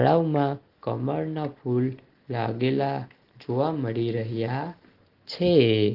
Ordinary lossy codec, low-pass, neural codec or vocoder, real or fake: Opus, 16 kbps; 5.4 kHz; none; real